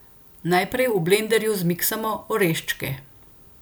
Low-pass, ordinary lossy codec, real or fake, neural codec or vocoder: none; none; real; none